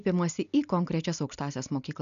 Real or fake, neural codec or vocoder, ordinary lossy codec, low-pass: real; none; MP3, 96 kbps; 7.2 kHz